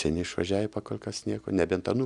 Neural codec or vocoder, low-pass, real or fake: none; 10.8 kHz; real